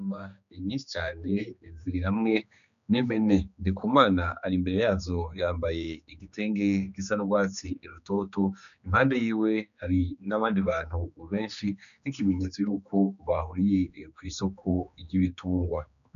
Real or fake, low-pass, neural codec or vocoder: fake; 7.2 kHz; codec, 16 kHz, 2 kbps, X-Codec, HuBERT features, trained on general audio